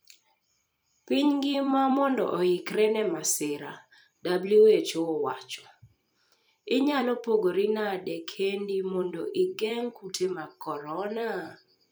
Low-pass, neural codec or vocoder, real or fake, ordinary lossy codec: none; vocoder, 44.1 kHz, 128 mel bands every 256 samples, BigVGAN v2; fake; none